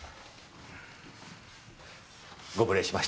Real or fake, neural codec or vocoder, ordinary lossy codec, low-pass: real; none; none; none